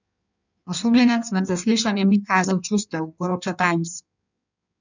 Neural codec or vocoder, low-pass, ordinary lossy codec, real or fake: codec, 16 kHz in and 24 kHz out, 1.1 kbps, FireRedTTS-2 codec; 7.2 kHz; none; fake